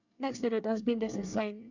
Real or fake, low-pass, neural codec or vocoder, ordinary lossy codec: fake; 7.2 kHz; codec, 24 kHz, 1 kbps, SNAC; none